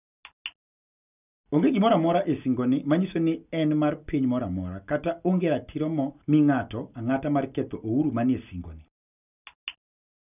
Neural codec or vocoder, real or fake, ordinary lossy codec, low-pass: none; real; none; 3.6 kHz